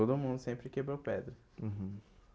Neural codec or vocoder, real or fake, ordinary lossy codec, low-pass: none; real; none; none